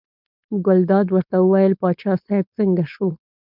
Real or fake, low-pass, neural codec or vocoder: fake; 5.4 kHz; codec, 16 kHz, 4.8 kbps, FACodec